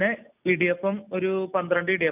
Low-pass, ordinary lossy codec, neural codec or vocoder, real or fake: 3.6 kHz; none; none; real